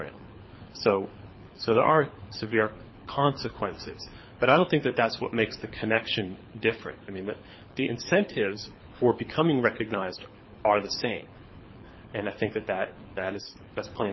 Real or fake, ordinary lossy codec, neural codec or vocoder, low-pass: fake; MP3, 24 kbps; codec, 24 kHz, 6 kbps, HILCodec; 7.2 kHz